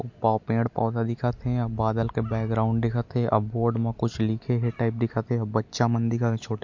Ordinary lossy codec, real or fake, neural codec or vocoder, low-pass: MP3, 48 kbps; real; none; 7.2 kHz